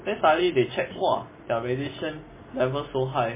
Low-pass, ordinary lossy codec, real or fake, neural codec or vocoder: 3.6 kHz; MP3, 16 kbps; real; none